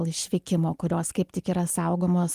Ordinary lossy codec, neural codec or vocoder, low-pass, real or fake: Opus, 24 kbps; none; 14.4 kHz; real